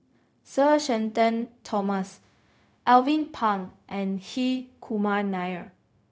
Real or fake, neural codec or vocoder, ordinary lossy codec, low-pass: fake; codec, 16 kHz, 0.4 kbps, LongCat-Audio-Codec; none; none